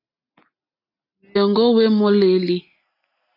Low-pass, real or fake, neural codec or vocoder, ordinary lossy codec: 5.4 kHz; real; none; AAC, 32 kbps